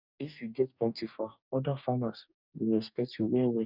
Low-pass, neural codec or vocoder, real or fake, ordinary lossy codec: 5.4 kHz; codec, 44.1 kHz, 2.6 kbps, DAC; fake; none